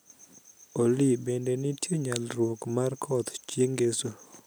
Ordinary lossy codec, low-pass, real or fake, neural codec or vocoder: none; none; real; none